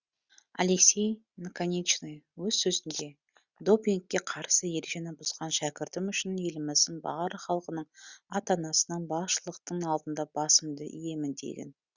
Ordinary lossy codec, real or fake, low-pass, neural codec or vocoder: Opus, 64 kbps; real; 7.2 kHz; none